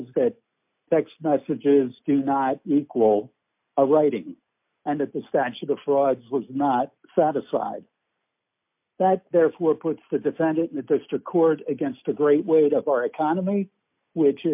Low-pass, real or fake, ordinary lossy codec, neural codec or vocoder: 3.6 kHz; real; MP3, 32 kbps; none